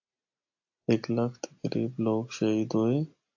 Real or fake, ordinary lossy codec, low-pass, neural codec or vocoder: real; AAC, 48 kbps; 7.2 kHz; none